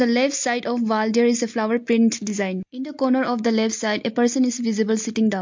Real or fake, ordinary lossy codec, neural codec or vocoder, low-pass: real; MP3, 48 kbps; none; 7.2 kHz